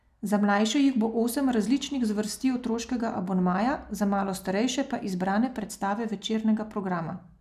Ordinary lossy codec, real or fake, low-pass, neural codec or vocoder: none; real; 14.4 kHz; none